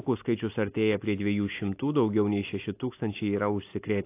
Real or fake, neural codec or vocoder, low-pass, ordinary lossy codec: real; none; 3.6 kHz; AAC, 24 kbps